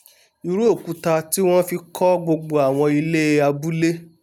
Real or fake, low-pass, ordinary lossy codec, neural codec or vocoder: real; 19.8 kHz; none; none